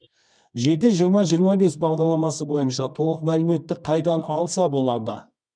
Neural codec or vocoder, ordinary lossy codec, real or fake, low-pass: codec, 24 kHz, 0.9 kbps, WavTokenizer, medium music audio release; none; fake; 9.9 kHz